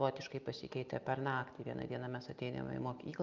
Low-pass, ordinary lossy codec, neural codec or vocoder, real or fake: 7.2 kHz; Opus, 24 kbps; none; real